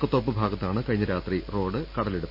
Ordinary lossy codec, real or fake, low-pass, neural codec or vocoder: none; real; 5.4 kHz; none